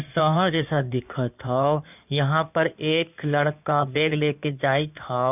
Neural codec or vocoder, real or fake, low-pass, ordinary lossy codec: codec, 16 kHz in and 24 kHz out, 2.2 kbps, FireRedTTS-2 codec; fake; 3.6 kHz; none